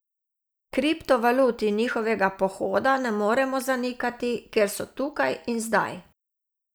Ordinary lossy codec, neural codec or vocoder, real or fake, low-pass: none; none; real; none